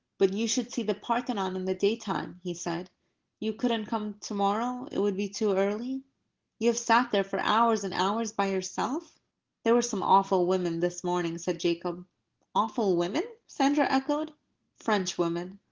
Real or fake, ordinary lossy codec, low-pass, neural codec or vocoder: real; Opus, 16 kbps; 7.2 kHz; none